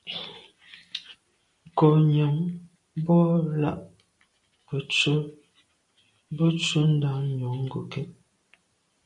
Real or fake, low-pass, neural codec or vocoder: real; 10.8 kHz; none